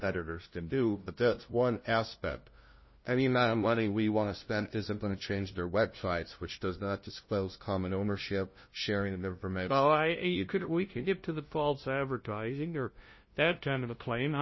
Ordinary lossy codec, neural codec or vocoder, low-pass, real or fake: MP3, 24 kbps; codec, 16 kHz, 0.5 kbps, FunCodec, trained on LibriTTS, 25 frames a second; 7.2 kHz; fake